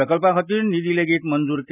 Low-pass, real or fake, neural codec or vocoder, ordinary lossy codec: 3.6 kHz; real; none; none